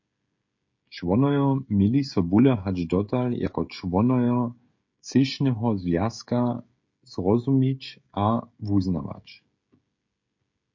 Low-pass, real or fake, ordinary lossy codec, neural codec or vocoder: 7.2 kHz; fake; MP3, 48 kbps; codec, 16 kHz, 16 kbps, FreqCodec, smaller model